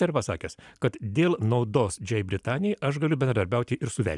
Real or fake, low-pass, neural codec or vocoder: real; 10.8 kHz; none